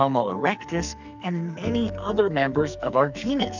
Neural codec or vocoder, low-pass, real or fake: codec, 44.1 kHz, 2.6 kbps, SNAC; 7.2 kHz; fake